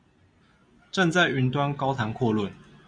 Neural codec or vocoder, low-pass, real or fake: none; 9.9 kHz; real